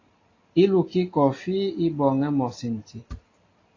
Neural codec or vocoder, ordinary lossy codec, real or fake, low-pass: none; AAC, 32 kbps; real; 7.2 kHz